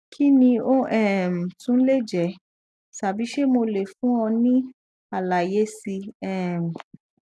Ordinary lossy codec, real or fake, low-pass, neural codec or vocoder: none; real; none; none